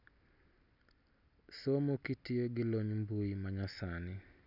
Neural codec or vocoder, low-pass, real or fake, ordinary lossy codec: none; 5.4 kHz; real; none